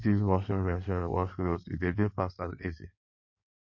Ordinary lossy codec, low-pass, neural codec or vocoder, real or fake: none; 7.2 kHz; codec, 16 kHz in and 24 kHz out, 1.1 kbps, FireRedTTS-2 codec; fake